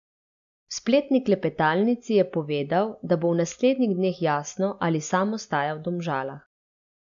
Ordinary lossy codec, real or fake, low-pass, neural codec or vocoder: MP3, 96 kbps; real; 7.2 kHz; none